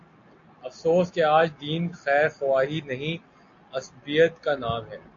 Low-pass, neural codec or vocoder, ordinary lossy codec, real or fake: 7.2 kHz; none; AAC, 48 kbps; real